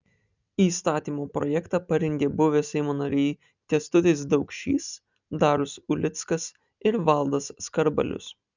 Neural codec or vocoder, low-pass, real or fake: none; 7.2 kHz; real